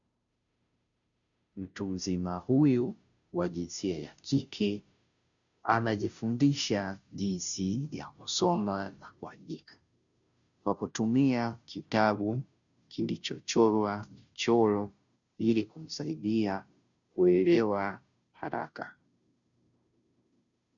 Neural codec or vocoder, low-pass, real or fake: codec, 16 kHz, 0.5 kbps, FunCodec, trained on Chinese and English, 25 frames a second; 7.2 kHz; fake